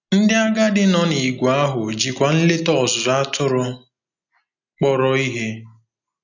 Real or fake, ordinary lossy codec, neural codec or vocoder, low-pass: real; none; none; 7.2 kHz